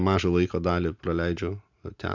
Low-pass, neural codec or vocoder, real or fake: 7.2 kHz; none; real